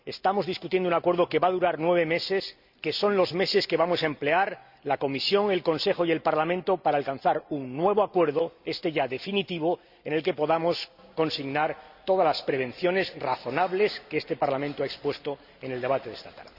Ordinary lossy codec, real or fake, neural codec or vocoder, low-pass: Opus, 64 kbps; real; none; 5.4 kHz